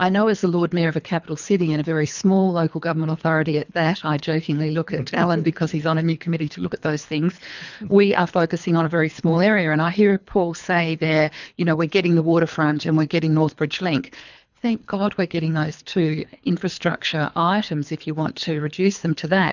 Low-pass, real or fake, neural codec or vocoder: 7.2 kHz; fake; codec, 24 kHz, 3 kbps, HILCodec